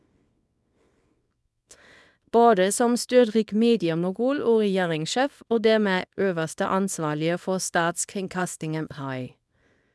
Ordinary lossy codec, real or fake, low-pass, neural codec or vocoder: none; fake; none; codec, 24 kHz, 0.9 kbps, WavTokenizer, small release